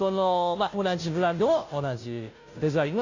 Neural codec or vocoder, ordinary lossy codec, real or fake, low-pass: codec, 16 kHz, 0.5 kbps, FunCodec, trained on Chinese and English, 25 frames a second; AAC, 48 kbps; fake; 7.2 kHz